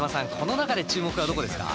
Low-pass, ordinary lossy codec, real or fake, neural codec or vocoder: none; none; real; none